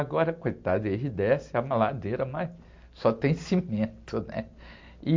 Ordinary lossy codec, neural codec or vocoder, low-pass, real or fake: MP3, 48 kbps; none; 7.2 kHz; real